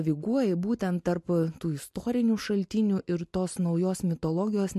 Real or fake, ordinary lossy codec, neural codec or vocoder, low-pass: real; MP3, 64 kbps; none; 14.4 kHz